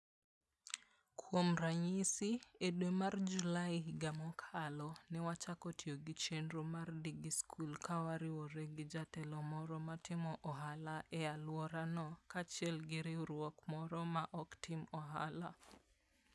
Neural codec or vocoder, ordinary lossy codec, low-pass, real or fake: none; none; none; real